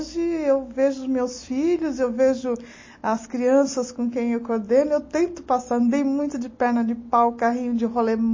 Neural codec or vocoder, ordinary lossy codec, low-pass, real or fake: none; MP3, 32 kbps; 7.2 kHz; real